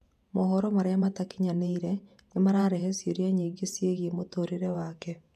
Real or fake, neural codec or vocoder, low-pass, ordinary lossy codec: fake; vocoder, 48 kHz, 128 mel bands, Vocos; 14.4 kHz; none